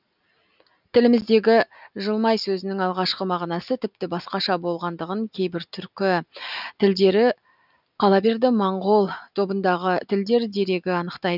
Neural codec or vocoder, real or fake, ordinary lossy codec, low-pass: none; real; none; 5.4 kHz